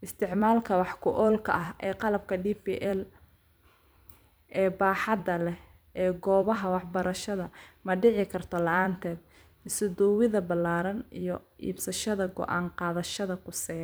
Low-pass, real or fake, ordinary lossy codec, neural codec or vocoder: none; fake; none; vocoder, 44.1 kHz, 128 mel bands every 256 samples, BigVGAN v2